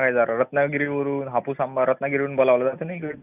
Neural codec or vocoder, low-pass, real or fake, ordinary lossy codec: none; 3.6 kHz; real; none